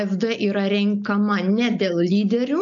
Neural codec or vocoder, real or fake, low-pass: none; real; 7.2 kHz